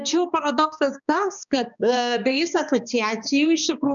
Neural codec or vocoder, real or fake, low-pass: codec, 16 kHz, 2 kbps, X-Codec, HuBERT features, trained on balanced general audio; fake; 7.2 kHz